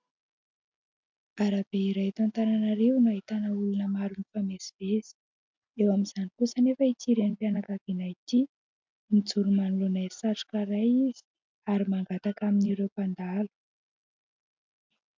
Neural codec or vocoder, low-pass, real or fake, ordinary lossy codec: none; 7.2 kHz; real; MP3, 64 kbps